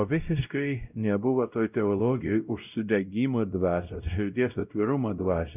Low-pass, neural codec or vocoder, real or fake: 3.6 kHz; codec, 16 kHz, 0.5 kbps, X-Codec, WavLM features, trained on Multilingual LibriSpeech; fake